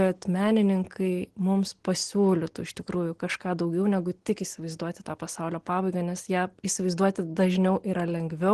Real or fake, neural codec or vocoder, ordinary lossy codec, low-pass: real; none; Opus, 16 kbps; 10.8 kHz